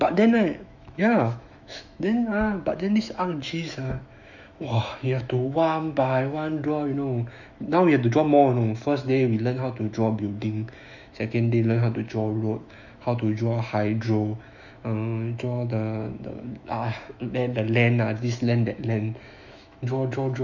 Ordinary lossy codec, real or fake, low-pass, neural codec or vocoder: none; fake; 7.2 kHz; autoencoder, 48 kHz, 128 numbers a frame, DAC-VAE, trained on Japanese speech